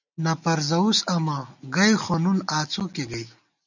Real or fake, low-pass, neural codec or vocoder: real; 7.2 kHz; none